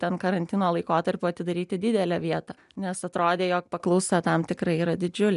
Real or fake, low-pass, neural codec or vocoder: real; 10.8 kHz; none